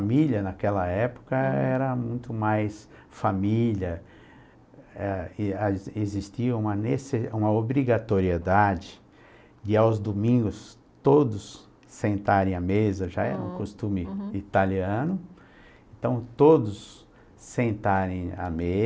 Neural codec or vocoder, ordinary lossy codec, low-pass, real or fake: none; none; none; real